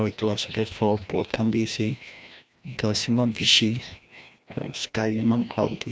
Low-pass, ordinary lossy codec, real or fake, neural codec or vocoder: none; none; fake; codec, 16 kHz, 1 kbps, FreqCodec, larger model